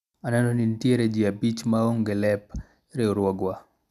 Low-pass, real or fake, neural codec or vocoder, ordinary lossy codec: 14.4 kHz; real; none; none